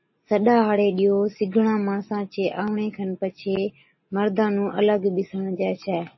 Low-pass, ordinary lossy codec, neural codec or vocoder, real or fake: 7.2 kHz; MP3, 24 kbps; none; real